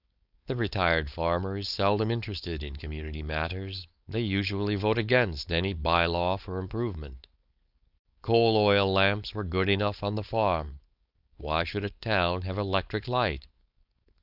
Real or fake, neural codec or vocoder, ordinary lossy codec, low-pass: fake; codec, 16 kHz, 4.8 kbps, FACodec; Opus, 64 kbps; 5.4 kHz